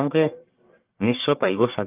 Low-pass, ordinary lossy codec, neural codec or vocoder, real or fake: 3.6 kHz; Opus, 32 kbps; codec, 44.1 kHz, 1.7 kbps, Pupu-Codec; fake